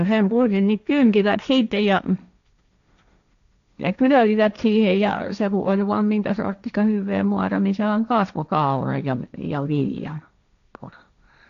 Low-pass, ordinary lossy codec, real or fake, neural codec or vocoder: 7.2 kHz; none; fake; codec, 16 kHz, 1.1 kbps, Voila-Tokenizer